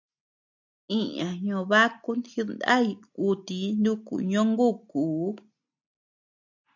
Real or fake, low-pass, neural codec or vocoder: real; 7.2 kHz; none